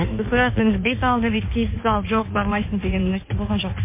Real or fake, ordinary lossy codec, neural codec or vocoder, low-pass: fake; MP3, 24 kbps; codec, 16 kHz in and 24 kHz out, 1.1 kbps, FireRedTTS-2 codec; 3.6 kHz